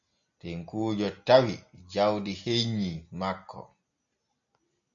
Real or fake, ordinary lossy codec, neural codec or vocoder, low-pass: real; MP3, 64 kbps; none; 7.2 kHz